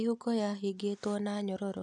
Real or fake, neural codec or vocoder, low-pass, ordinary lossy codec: real; none; 10.8 kHz; none